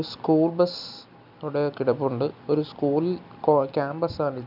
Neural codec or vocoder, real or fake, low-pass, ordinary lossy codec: none; real; 5.4 kHz; none